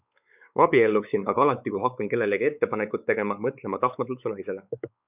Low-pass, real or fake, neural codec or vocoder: 3.6 kHz; fake; codec, 16 kHz, 4 kbps, X-Codec, WavLM features, trained on Multilingual LibriSpeech